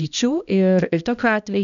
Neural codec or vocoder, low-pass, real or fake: codec, 16 kHz, 1 kbps, X-Codec, HuBERT features, trained on balanced general audio; 7.2 kHz; fake